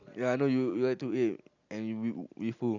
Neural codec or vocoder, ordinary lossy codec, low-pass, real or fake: none; none; 7.2 kHz; real